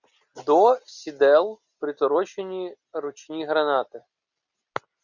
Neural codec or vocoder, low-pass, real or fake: none; 7.2 kHz; real